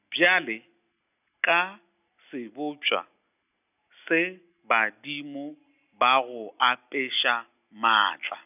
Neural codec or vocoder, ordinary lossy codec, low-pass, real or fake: none; none; 3.6 kHz; real